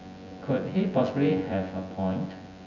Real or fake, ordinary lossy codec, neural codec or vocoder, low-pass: fake; none; vocoder, 24 kHz, 100 mel bands, Vocos; 7.2 kHz